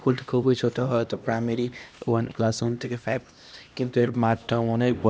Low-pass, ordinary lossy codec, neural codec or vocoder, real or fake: none; none; codec, 16 kHz, 1 kbps, X-Codec, HuBERT features, trained on LibriSpeech; fake